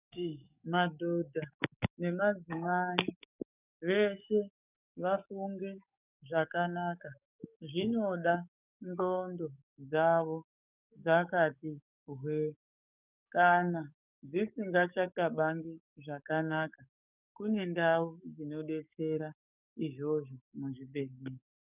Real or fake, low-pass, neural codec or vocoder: fake; 3.6 kHz; codec, 44.1 kHz, 7.8 kbps, DAC